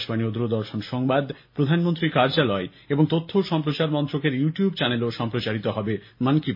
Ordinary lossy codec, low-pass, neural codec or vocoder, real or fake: MP3, 32 kbps; 5.4 kHz; none; real